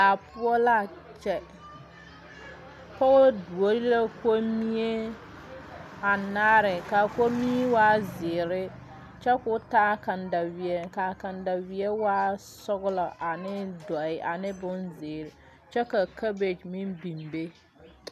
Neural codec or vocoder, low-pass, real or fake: none; 14.4 kHz; real